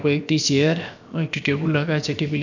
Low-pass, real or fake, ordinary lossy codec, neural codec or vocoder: 7.2 kHz; fake; none; codec, 16 kHz, about 1 kbps, DyCAST, with the encoder's durations